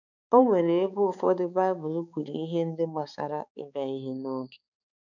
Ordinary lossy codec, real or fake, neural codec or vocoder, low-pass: none; fake; codec, 16 kHz, 4 kbps, X-Codec, HuBERT features, trained on balanced general audio; 7.2 kHz